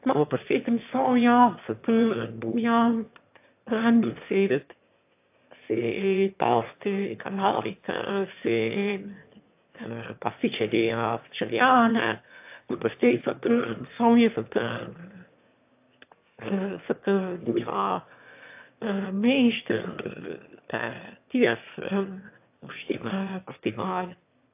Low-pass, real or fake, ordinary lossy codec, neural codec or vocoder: 3.6 kHz; fake; AAC, 32 kbps; autoencoder, 22.05 kHz, a latent of 192 numbers a frame, VITS, trained on one speaker